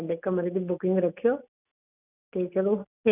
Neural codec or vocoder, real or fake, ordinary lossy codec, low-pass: none; real; none; 3.6 kHz